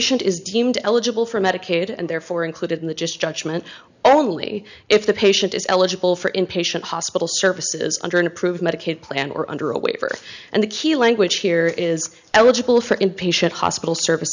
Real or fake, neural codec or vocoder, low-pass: real; none; 7.2 kHz